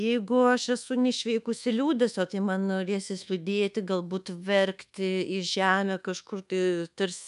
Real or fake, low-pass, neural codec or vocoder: fake; 10.8 kHz; codec, 24 kHz, 1.2 kbps, DualCodec